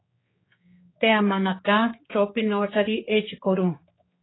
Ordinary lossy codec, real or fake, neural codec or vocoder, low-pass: AAC, 16 kbps; fake; codec, 16 kHz, 4 kbps, X-Codec, HuBERT features, trained on general audio; 7.2 kHz